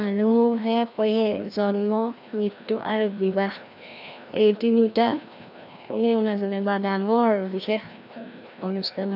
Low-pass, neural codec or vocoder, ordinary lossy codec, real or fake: 5.4 kHz; codec, 16 kHz, 1 kbps, FreqCodec, larger model; none; fake